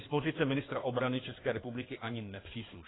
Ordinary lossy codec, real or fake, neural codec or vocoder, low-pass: AAC, 16 kbps; fake; codec, 16 kHz in and 24 kHz out, 2.2 kbps, FireRedTTS-2 codec; 7.2 kHz